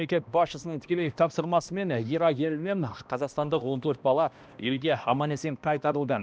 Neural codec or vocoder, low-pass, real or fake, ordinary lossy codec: codec, 16 kHz, 1 kbps, X-Codec, HuBERT features, trained on balanced general audio; none; fake; none